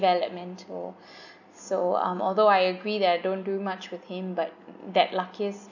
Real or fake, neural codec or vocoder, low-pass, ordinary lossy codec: real; none; 7.2 kHz; none